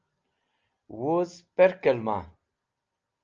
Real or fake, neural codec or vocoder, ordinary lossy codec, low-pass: real; none; Opus, 24 kbps; 7.2 kHz